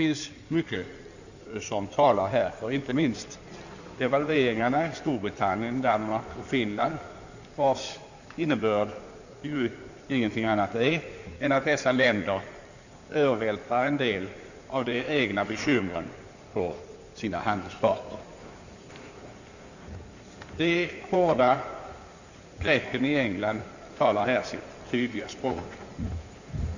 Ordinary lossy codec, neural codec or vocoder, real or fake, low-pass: none; codec, 16 kHz in and 24 kHz out, 2.2 kbps, FireRedTTS-2 codec; fake; 7.2 kHz